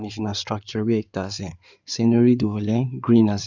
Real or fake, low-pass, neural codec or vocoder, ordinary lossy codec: fake; 7.2 kHz; codec, 16 kHz in and 24 kHz out, 2.2 kbps, FireRedTTS-2 codec; none